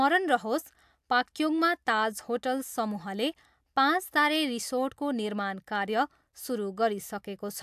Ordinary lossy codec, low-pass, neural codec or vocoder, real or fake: AAC, 96 kbps; 14.4 kHz; none; real